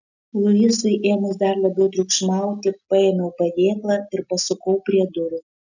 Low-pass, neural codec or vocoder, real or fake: 7.2 kHz; none; real